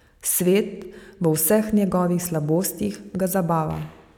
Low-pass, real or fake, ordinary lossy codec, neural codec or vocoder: none; real; none; none